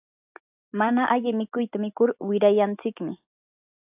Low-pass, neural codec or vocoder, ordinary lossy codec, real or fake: 3.6 kHz; none; AAC, 32 kbps; real